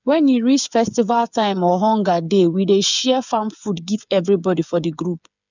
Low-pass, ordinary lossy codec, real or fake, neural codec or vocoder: 7.2 kHz; none; fake; codec, 16 kHz, 8 kbps, FreqCodec, smaller model